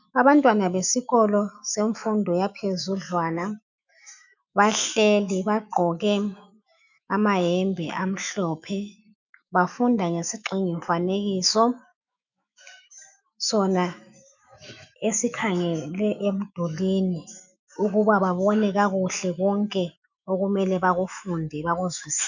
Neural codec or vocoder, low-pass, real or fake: autoencoder, 48 kHz, 128 numbers a frame, DAC-VAE, trained on Japanese speech; 7.2 kHz; fake